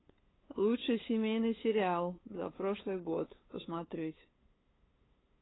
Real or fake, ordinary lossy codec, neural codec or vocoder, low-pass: fake; AAC, 16 kbps; codec, 16 kHz, 8 kbps, FunCodec, trained on LibriTTS, 25 frames a second; 7.2 kHz